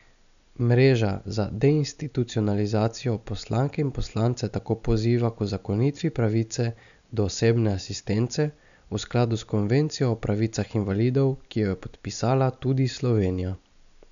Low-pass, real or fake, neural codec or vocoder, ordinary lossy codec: 7.2 kHz; real; none; none